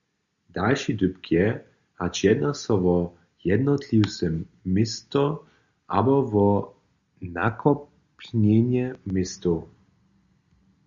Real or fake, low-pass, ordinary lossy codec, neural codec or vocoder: real; 7.2 kHz; Opus, 64 kbps; none